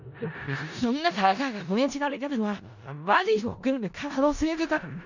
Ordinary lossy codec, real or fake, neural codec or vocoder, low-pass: none; fake; codec, 16 kHz in and 24 kHz out, 0.4 kbps, LongCat-Audio-Codec, four codebook decoder; 7.2 kHz